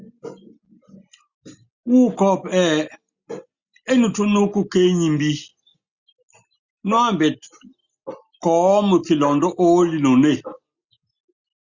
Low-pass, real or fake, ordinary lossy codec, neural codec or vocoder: 7.2 kHz; real; Opus, 64 kbps; none